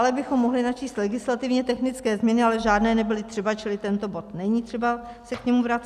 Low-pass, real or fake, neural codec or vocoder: 14.4 kHz; real; none